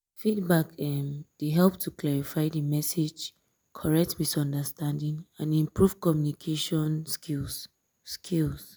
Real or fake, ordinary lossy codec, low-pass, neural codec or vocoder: real; none; none; none